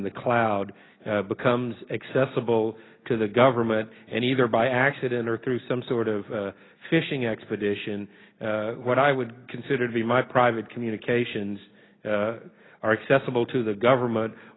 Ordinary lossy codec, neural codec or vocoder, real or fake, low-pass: AAC, 16 kbps; none; real; 7.2 kHz